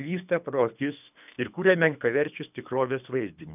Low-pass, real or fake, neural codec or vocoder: 3.6 kHz; fake; codec, 24 kHz, 3 kbps, HILCodec